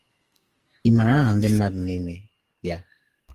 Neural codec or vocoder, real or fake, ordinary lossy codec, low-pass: codec, 44.1 kHz, 2.6 kbps, SNAC; fake; Opus, 24 kbps; 14.4 kHz